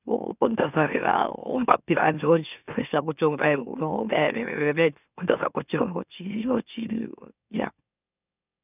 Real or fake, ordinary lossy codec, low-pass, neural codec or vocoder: fake; none; 3.6 kHz; autoencoder, 44.1 kHz, a latent of 192 numbers a frame, MeloTTS